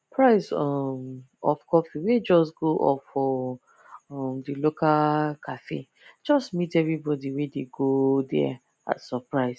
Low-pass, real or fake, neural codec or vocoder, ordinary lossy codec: none; real; none; none